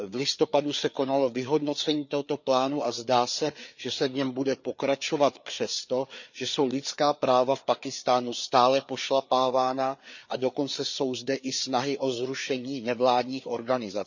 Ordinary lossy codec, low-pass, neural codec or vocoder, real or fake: none; 7.2 kHz; codec, 16 kHz, 4 kbps, FreqCodec, larger model; fake